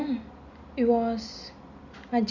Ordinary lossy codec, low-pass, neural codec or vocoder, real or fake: none; 7.2 kHz; none; real